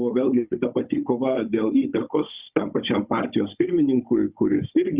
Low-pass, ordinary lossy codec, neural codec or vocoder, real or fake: 3.6 kHz; Opus, 64 kbps; codec, 16 kHz, 16 kbps, FunCodec, trained on Chinese and English, 50 frames a second; fake